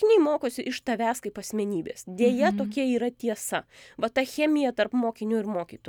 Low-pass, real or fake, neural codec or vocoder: 19.8 kHz; real; none